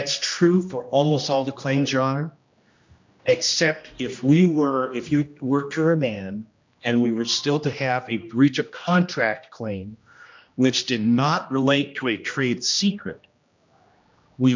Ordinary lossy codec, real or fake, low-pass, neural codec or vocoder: MP3, 64 kbps; fake; 7.2 kHz; codec, 16 kHz, 1 kbps, X-Codec, HuBERT features, trained on general audio